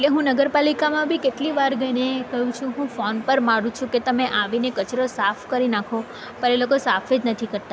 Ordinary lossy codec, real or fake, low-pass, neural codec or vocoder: none; real; none; none